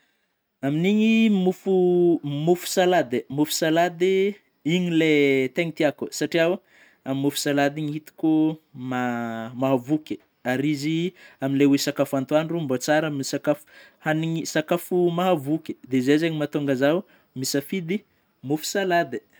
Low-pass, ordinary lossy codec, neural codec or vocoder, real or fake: none; none; none; real